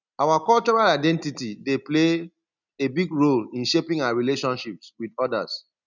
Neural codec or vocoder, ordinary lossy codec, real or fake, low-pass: none; none; real; 7.2 kHz